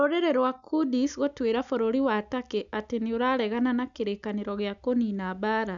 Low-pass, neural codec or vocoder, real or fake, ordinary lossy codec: 7.2 kHz; none; real; none